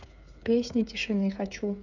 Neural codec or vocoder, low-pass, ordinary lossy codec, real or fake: codec, 16 kHz, 8 kbps, FreqCodec, smaller model; 7.2 kHz; none; fake